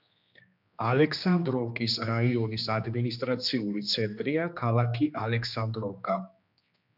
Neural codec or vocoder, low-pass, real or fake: codec, 16 kHz, 2 kbps, X-Codec, HuBERT features, trained on general audio; 5.4 kHz; fake